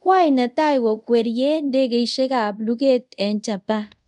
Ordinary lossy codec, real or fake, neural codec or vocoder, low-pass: none; fake; codec, 24 kHz, 0.5 kbps, DualCodec; 10.8 kHz